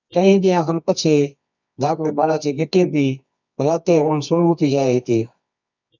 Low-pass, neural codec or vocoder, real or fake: 7.2 kHz; codec, 24 kHz, 0.9 kbps, WavTokenizer, medium music audio release; fake